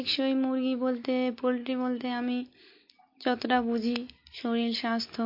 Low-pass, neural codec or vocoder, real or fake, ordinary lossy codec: 5.4 kHz; none; real; MP3, 32 kbps